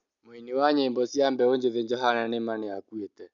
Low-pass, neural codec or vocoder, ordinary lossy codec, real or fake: 7.2 kHz; none; none; real